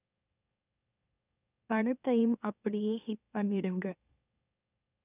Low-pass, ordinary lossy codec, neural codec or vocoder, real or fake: 3.6 kHz; none; autoencoder, 44.1 kHz, a latent of 192 numbers a frame, MeloTTS; fake